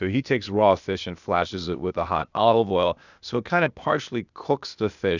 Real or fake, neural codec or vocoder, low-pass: fake; codec, 16 kHz, 0.8 kbps, ZipCodec; 7.2 kHz